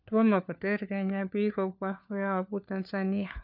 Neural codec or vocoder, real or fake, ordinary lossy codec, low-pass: codec, 16 kHz, 4 kbps, FunCodec, trained on LibriTTS, 50 frames a second; fake; none; 5.4 kHz